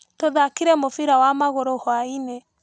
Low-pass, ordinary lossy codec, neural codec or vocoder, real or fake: none; none; none; real